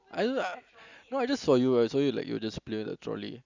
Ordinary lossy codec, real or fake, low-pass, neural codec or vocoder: Opus, 64 kbps; real; 7.2 kHz; none